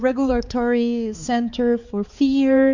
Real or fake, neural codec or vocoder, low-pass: fake; codec, 16 kHz, 4 kbps, X-Codec, HuBERT features, trained on balanced general audio; 7.2 kHz